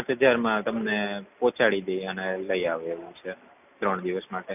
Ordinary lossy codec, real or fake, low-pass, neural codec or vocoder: none; real; 3.6 kHz; none